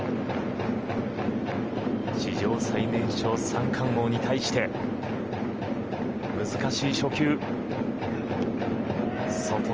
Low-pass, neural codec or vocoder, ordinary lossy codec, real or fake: 7.2 kHz; none; Opus, 24 kbps; real